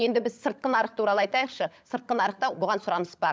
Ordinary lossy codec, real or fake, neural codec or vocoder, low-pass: none; fake; codec, 16 kHz, 16 kbps, FunCodec, trained on LibriTTS, 50 frames a second; none